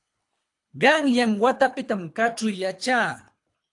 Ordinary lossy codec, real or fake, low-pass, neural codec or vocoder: AAC, 64 kbps; fake; 10.8 kHz; codec, 24 kHz, 3 kbps, HILCodec